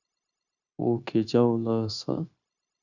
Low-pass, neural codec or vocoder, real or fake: 7.2 kHz; codec, 16 kHz, 0.9 kbps, LongCat-Audio-Codec; fake